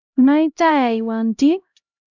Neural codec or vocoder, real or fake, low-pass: codec, 16 kHz, 0.5 kbps, X-Codec, HuBERT features, trained on LibriSpeech; fake; 7.2 kHz